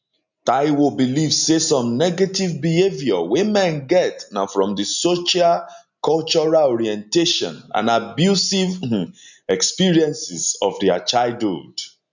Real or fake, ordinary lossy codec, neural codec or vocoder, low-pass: real; none; none; 7.2 kHz